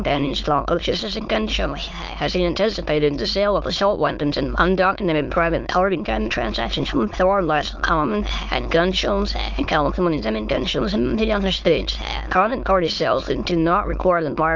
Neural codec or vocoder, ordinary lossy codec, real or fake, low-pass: autoencoder, 22.05 kHz, a latent of 192 numbers a frame, VITS, trained on many speakers; Opus, 32 kbps; fake; 7.2 kHz